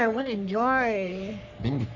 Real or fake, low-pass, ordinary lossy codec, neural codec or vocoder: fake; 7.2 kHz; none; codec, 44.1 kHz, 3.4 kbps, Pupu-Codec